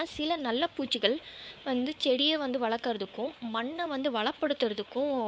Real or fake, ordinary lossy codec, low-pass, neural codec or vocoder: fake; none; none; codec, 16 kHz, 4 kbps, X-Codec, WavLM features, trained on Multilingual LibriSpeech